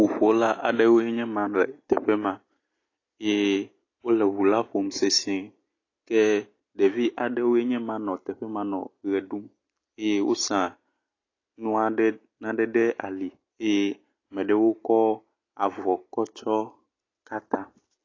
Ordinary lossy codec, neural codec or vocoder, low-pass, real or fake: AAC, 32 kbps; none; 7.2 kHz; real